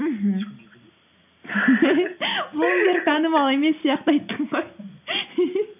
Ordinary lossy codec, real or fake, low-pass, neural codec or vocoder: none; real; 3.6 kHz; none